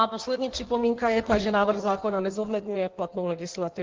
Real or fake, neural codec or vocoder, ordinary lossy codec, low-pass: fake; codec, 16 kHz in and 24 kHz out, 1.1 kbps, FireRedTTS-2 codec; Opus, 16 kbps; 7.2 kHz